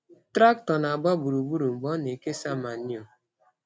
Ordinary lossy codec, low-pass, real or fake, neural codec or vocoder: none; none; real; none